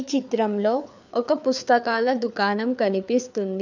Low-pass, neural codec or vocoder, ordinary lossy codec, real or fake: 7.2 kHz; codec, 16 kHz, 4 kbps, FreqCodec, larger model; none; fake